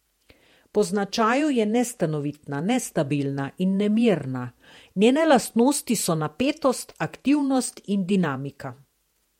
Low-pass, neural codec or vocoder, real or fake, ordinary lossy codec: 19.8 kHz; vocoder, 48 kHz, 128 mel bands, Vocos; fake; MP3, 64 kbps